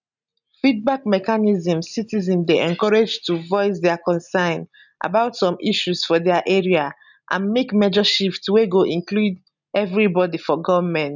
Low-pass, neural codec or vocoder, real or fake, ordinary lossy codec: 7.2 kHz; none; real; none